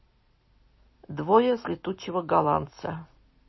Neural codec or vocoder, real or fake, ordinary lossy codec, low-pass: none; real; MP3, 24 kbps; 7.2 kHz